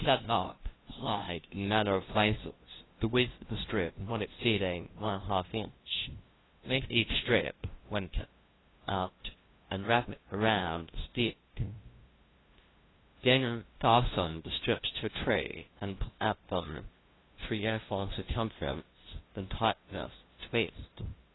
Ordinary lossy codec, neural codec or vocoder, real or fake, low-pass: AAC, 16 kbps; codec, 16 kHz, 0.5 kbps, FunCodec, trained on LibriTTS, 25 frames a second; fake; 7.2 kHz